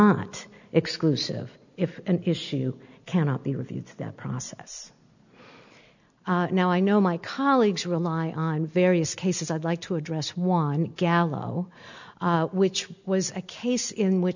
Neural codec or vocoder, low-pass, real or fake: none; 7.2 kHz; real